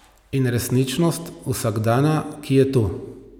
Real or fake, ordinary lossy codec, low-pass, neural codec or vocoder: real; none; none; none